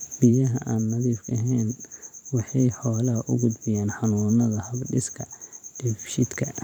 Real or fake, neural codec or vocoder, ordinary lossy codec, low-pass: fake; vocoder, 44.1 kHz, 128 mel bands every 512 samples, BigVGAN v2; none; 19.8 kHz